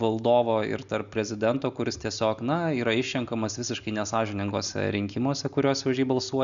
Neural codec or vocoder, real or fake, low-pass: none; real; 7.2 kHz